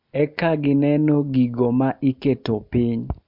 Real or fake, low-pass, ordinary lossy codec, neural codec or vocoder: real; 5.4 kHz; MP3, 48 kbps; none